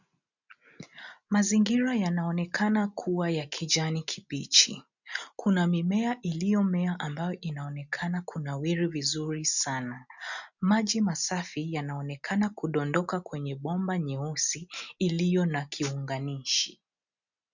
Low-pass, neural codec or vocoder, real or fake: 7.2 kHz; none; real